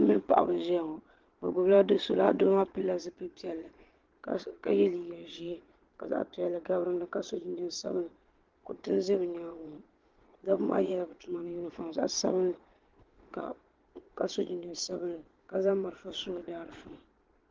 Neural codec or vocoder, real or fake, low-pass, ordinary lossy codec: vocoder, 22.05 kHz, 80 mel bands, Vocos; fake; 7.2 kHz; Opus, 16 kbps